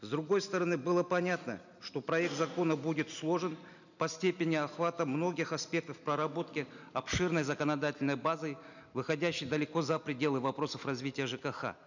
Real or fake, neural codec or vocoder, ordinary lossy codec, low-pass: real; none; none; 7.2 kHz